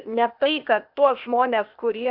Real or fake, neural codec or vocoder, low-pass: fake; codec, 16 kHz, 0.8 kbps, ZipCodec; 5.4 kHz